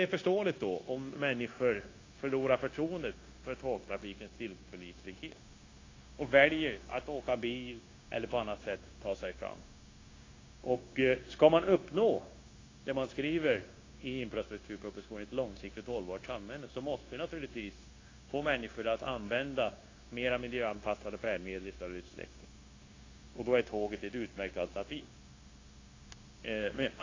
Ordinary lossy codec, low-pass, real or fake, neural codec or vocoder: AAC, 32 kbps; 7.2 kHz; fake; codec, 16 kHz, 0.9 kbps, LongCat-Audio-Codec